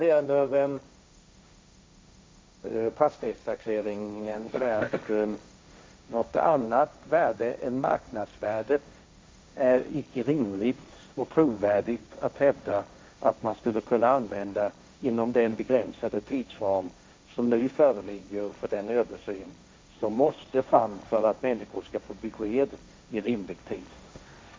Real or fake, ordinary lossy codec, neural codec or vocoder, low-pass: fake; none; codec, 16 kHz, 1.1 kbps, Voila-Tokenizer; none